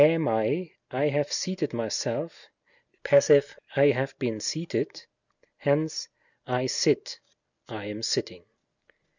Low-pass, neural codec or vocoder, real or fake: 7.2 kHz; none; real